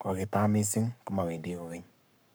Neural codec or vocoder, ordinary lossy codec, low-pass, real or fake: codec, 44.1 kHz, 7.8 kbps, Pupu-Codec; none; none; fake